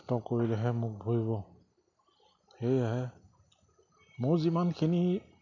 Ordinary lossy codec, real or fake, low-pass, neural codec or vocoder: none; real; 7.2 kHz; none